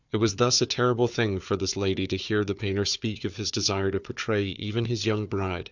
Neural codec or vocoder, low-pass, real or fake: codec, 16 kHz, 4 kbps, FunCodec, trained on Chinese and English, 50 frames a second; 7.2 kHz; fake